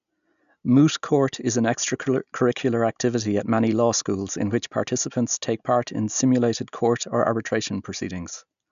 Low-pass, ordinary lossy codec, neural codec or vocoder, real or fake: 7.2 kHz; none; none; real